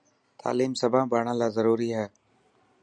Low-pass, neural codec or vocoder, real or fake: 9.9 kHz; none; real